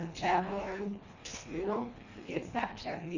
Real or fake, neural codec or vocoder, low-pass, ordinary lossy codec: fake; codec, 24 kHz, 1.5 kbps, HILCodec; 7.2 kHz; none